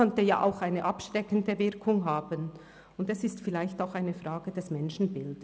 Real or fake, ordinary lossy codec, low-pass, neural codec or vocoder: real; none; none; none